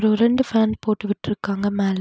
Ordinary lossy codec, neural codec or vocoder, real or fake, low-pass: none; none; real; none